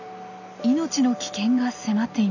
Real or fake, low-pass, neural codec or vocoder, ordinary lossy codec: real; 7.2 kHz; none; none